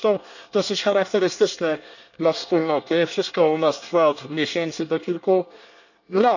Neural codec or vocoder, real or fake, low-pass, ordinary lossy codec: codec, 24 kHz, 1 kbps, SNAC; fake; 7.2 kHz; none